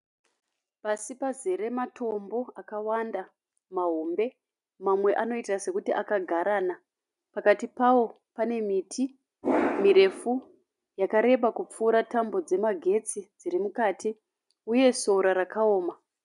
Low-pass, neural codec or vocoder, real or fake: 10.8 kHz; none; real